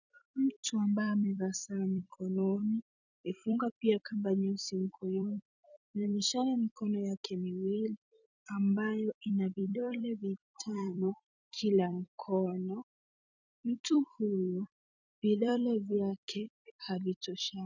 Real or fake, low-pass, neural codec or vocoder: fake; 7.2 kHz; vocoder, 44.1 kHz, 128 mel bands every 256 samples, BigVGAN v2